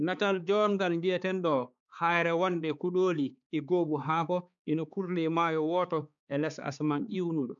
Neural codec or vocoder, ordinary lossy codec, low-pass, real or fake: codec, 16 kHz, 4 kbps, X-Codec, HuBERT features, trained on balanced general audio; none; 7.2 kHz; fake